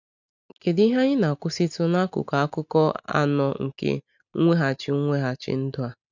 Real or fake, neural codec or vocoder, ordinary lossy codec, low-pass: real; none; none; 7.2 kHz